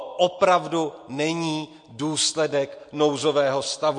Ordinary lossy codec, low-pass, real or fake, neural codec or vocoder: MP3, 48 kbps; 9.9 kHz; real; none